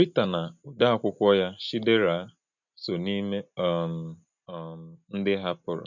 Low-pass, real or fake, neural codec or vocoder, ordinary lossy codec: 7.2 kHz; real; none; none